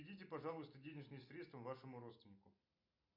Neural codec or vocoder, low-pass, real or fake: none; 5.4 kHz; real